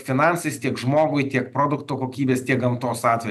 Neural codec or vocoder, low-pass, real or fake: none; 14.4 kHz; real